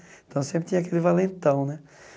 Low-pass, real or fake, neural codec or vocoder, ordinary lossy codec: none; real; none; none